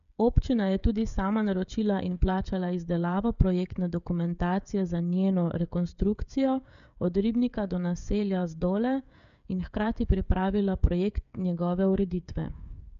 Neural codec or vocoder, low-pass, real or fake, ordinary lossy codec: codec, 16 kHz, 16 kbps, FreqCodec, smaller model; 7.2 kHz; fake; none